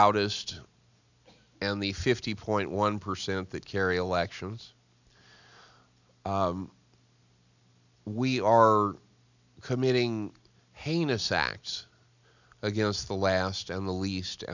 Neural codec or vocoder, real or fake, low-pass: none; real; 7.2 kHz